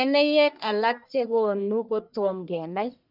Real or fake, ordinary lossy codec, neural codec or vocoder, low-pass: fake; none; codec, 44.1 kHz, 1.7 kbps, Pupu-Codec; 5.4 kHz